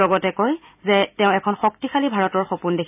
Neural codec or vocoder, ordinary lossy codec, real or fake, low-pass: none; none; real; 3.6 kHz